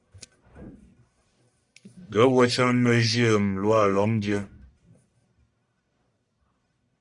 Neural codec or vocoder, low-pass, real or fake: codec, 44.1 kHz, 1.7 kbps, Pupu-Codec; 10.8 kHz; fake